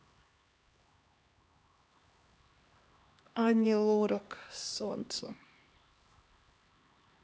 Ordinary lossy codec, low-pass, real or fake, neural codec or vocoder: none; none; fake; codec, 16 kHz, 2 kbps, X-Codec, HuBERT features, trained on LibriSpeech